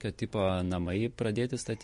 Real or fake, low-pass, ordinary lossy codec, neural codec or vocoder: real; 14.4 kHz; MP3, 48 kbps; none